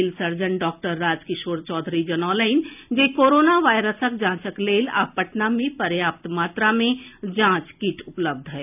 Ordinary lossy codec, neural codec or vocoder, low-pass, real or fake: none; none; 3.6 kHz; real